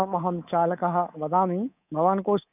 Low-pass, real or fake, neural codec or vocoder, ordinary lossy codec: 3.6 kHz; fake; codec, 24 kHz, 3.1 kbps, DualCodec; none